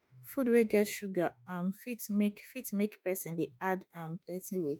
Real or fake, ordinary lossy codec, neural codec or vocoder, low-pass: fake; none; autoencoder, 48 kHz, 32 numbers a frame, DAC-VAE, trained on Japanese speech; none